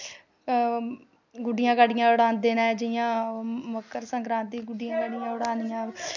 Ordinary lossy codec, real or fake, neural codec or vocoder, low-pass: none; real; none; 7.2 kHz